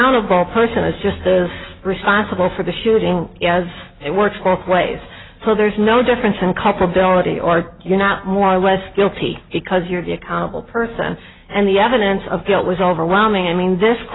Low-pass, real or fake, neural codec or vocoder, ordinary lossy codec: 7.2 kHz; real; none; AAC, 16 kbps